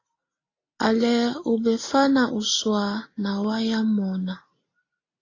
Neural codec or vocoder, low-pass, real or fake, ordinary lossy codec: none; 7.2 kHz; real; AAC, 32 kbps